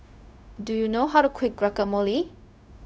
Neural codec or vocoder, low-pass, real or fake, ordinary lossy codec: codec, 16 kHz, 0.4 kbps, LongCat-Audio-Codec; none; fake; none